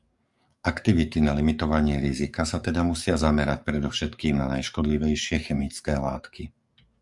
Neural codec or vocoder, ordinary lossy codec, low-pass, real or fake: codec, 44.1 kHz, 7.8 kbps, DAC; Opus, 64 kbps; 10.8 kHz; fake